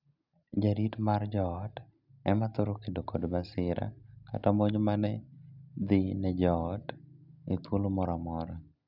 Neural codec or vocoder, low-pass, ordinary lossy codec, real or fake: none; 5.4 kHz; none; real